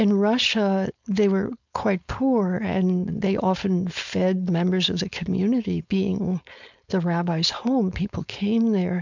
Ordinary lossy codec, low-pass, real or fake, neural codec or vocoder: MP3, 64 kbps; 7.2 kHz; fake; codec, 16 kHz, 4.8 kbps, FACodec